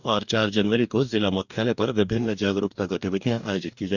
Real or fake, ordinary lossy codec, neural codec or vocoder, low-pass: fake; none; codec, 44.1 kHz, 2.6 kbps, DAC; 7.2 kHz